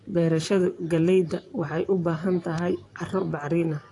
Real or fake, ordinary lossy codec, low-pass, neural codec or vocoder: fake; AAC, 48 kbps; 10.8 kHz; vocoder, 44.1 kHz, 128 mel bands, Pupu-Vocoder